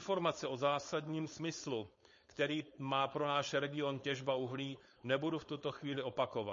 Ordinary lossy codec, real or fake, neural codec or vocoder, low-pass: MP3, 32 kbps; fake; codec, 16 kHz, 4.8 kbps, FACodec; 7.2 kHz